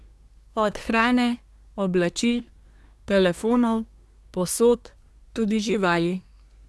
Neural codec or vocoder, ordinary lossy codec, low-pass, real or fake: codec, 24 kHz, 1 kbps, SNAC; none; none; fake